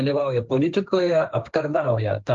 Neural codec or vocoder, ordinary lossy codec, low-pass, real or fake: codec, 16 kHz, 1.1 kbps, Voila-Tokenizer; Opus, 32 kbps; 7.2 kHz; fake